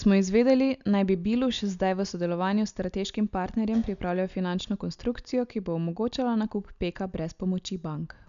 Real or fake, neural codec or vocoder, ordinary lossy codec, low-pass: real; none; MP3, 96 kbps; 7.2 kHz